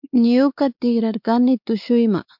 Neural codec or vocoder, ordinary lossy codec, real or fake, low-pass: codec, 16 kHz, 4 kbps, X-Codec, WavLM features, trained on Multilingual LibriSpeech; MP3, 48 kbps; fake; 5.4 kHz